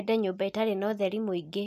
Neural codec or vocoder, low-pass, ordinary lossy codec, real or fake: none; 14.4 kHz; none; real